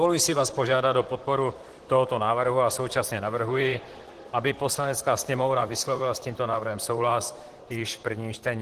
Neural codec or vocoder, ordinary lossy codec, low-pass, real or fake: vocoder, 44.1 kHz, 128 mel bands, Pupu-Vocoder; Opus, 16 kbps; 14.4 kHz; fake